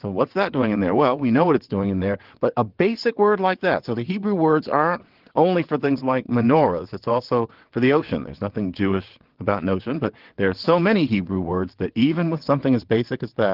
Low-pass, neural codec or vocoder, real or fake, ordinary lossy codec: 5.4 kHz; vocoder, 22.05 kHz, 80 mel bands, WaveNeXt; fake; Opus, 16 kbps